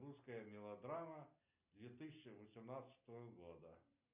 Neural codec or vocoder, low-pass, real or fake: none; 3.6 kHz; real